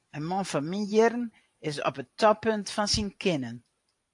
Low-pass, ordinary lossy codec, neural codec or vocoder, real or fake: 10.8 kHz; AAC, 64 kbps; vocoder, 44.1 kHz, 128 mel bands every 512 samples, BigVGAN v2; fake